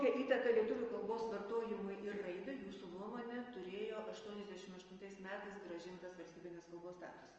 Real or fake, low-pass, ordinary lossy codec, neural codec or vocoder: real; 7.2 kHz; Opus, 24 kbps; none